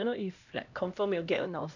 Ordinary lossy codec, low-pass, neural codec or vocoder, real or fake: none; 7.2 kHz; codec, 16 kHz, 1 kbps, X-Codec, HuBERT features, trained on LibriSpeech; fake